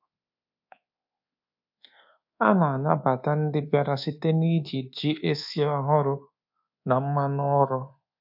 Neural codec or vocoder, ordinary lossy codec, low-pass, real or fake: codec, 24 kHz, 1.2 kbps, DualCodec; AAC, 48 kbps; 5.4 kHz; fake